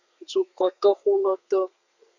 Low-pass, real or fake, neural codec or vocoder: 7.2 kHz; fake; codec, 32 kHz, 1.9 kbps, SNAC